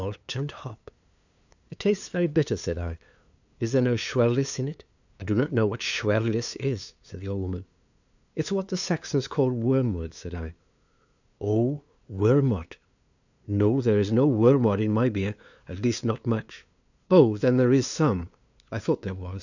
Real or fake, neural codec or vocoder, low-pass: fake; codec, 16 kHz, 2 kbps, FunCodec, trained on LibriTTS, 25 frames a second; 7.2 kHz